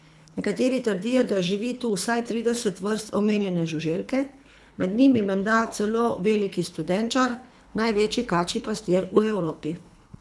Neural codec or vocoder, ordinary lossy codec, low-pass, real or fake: codec, 24 kHz, 3 kbps, HILCodec; none; none; fake